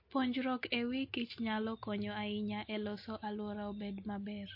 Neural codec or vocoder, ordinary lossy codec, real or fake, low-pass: none; MP3, 32 kbps; real; 5.4 kHz